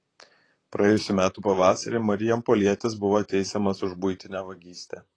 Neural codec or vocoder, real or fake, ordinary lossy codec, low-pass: vocoder, 44.1 kHz, 128 mel bands, Pupu-Vocoder; fake; AAC, 32 kbps; 9.9 kHz